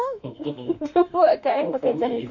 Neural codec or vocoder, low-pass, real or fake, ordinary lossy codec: autoencoder, 48 kHz, 32 numbers a frame, DAC-VAE, trained on Japanese speech; 7.2 kHz; fake; none